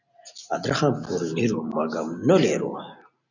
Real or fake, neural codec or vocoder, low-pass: real; none; 7.2 kHz